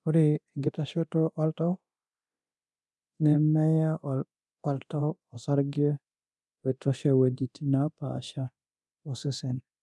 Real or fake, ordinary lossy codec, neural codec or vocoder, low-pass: fake; none; codec, 24 kHz, 0.9 kbps, DualCodec; none